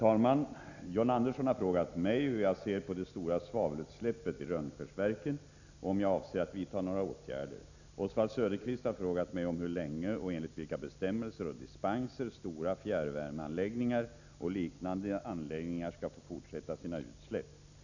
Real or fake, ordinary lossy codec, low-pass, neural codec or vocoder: real; none; 7.2 kHz; none